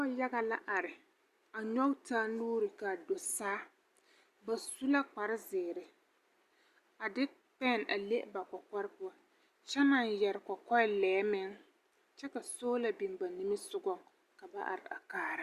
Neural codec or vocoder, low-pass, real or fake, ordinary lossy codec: none; 14.4 kHz; real; Opus, 64 kbps